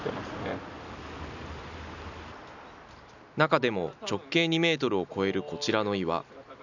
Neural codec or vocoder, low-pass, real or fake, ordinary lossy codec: none; 7.2 kHz; real; none